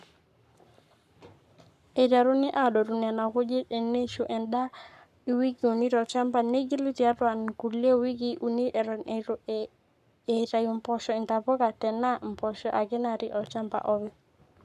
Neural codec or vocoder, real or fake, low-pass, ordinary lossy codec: codec, 44.1 kHz, 7.8 kbps, Pupu-Codec; fake; 14.4 kHz; none